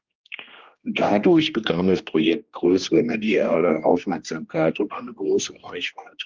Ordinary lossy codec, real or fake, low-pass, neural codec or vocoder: Opus, 32 kbps; fake; 7.2 kHz; codec, 16 kHz, 1 kbps, X-Codec, HuBERT features, trained on general audio